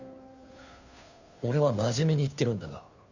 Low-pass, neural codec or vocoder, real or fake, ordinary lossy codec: 7.2 kHz; codec, 16 kHz, 2 kbps, FunCodec, trained on Chinese and English, 25 frames a second; fake; none